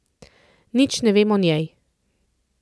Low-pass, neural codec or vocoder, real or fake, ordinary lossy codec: none; none; real; none